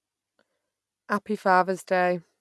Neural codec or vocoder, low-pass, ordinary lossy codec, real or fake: none; none; none; real